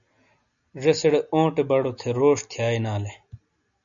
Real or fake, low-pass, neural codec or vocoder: real; 7.2 kHz; none